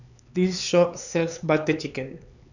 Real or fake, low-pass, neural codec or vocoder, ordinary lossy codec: fake; 7.2 kHz; codec, 16 kHz, 4 kbps, X-Codec, HuBERT features, trained on LibriSpeech; none